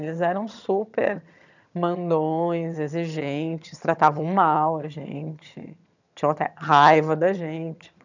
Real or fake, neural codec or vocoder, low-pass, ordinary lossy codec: fake; vocoder, 22.05 kHz, 80 mel bands, HiFi-GAN; 7.2 kHz; none